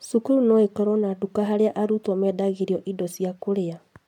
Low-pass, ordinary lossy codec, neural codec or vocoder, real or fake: 19.8 kHz; MP3, 96 kbps; none; real